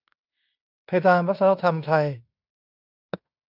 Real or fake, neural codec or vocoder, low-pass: fake; codec, 16 kHz in and 24 kHz out, 0.9 kbps, LongCat-Audio-Codec, fine tuned four codebook decoder; 5.4 kHz